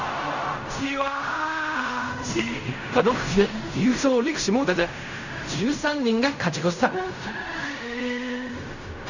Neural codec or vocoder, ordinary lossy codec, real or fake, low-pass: codec, 16 kHz in and 24 kHz out, 0.4 kbps, LongCat-Audio-Codec, fine tuned four codebook decoder; none; fake; 7.2 kHz